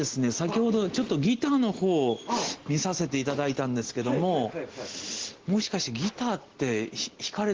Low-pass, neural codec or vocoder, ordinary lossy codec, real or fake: 7.2 kHz; none; Opus, 16 kbps; real